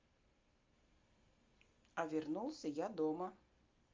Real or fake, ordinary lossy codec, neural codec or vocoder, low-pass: real; Opus, 32 kbps; none; 7.2 kHz